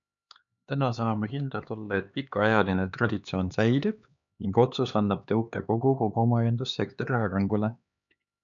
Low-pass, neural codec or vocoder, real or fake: 7.2 kHz; codec, 16 kHz, 2 kbps, X-Codec, HuBERT features, trained on LibriSpeech; fake